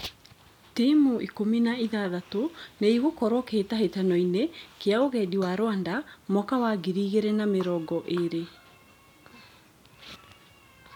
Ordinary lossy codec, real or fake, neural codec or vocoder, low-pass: none; real; none; 19.8 kHz